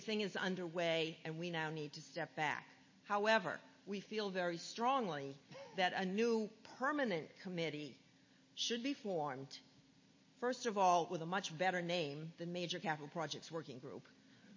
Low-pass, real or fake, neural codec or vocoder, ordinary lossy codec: 7.2 kHz; real; none; MP3, 32 kbps